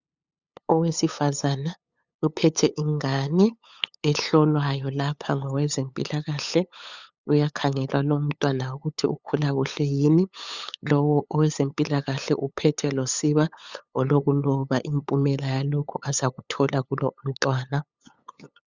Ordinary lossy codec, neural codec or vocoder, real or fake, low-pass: Opus, 64 kbps; codec, 16 kHz, 8 kbps, FunCodec, trained on LibriTTS, 25 frames a second; fake; 7.2 kHz